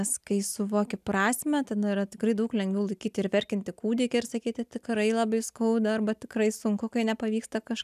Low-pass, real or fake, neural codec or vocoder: 14.4 kHz; real; none